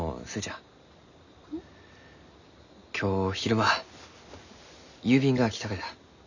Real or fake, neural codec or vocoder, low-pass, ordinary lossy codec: real; none; 7.2 kHz; none